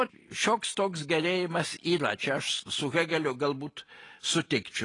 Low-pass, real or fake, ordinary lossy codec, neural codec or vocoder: 10.8 kHz; real; AAC, 32 kbps; none